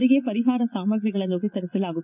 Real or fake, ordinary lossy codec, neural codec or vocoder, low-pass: fake; none; vocoder, 44.1 kHz, 80 mel bands, Vocos; 3.6 kHz